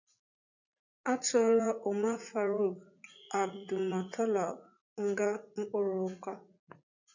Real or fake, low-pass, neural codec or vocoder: fake; 7.2 kHz; vocoder, 44.1 kHz, 80 mel bands, Vocos